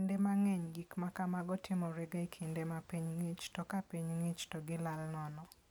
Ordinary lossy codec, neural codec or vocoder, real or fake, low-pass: none; none; real; none